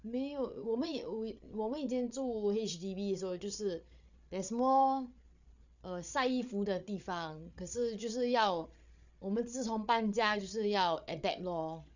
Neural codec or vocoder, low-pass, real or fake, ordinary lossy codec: codec, 16 kHz, 8 kbps, FreqCodec, larger model; 7.2 kHz; fake; none